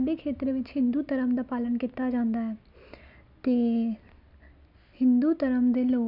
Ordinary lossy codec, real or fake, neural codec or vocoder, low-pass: none; real; none; 5.4 kHz